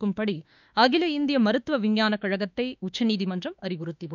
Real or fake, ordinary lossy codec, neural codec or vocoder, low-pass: fake; none; autoencoder, 48 kHz, 32 numbers a frame, DAC-VAE, trained on Japanese speech; 7.2 kHz